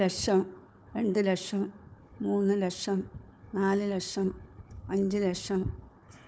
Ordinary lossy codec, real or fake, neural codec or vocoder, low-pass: none; fake; codec, 16 kHz, 4 kbps, FunCodec, trained on Chinese and English, 50 frames a second; none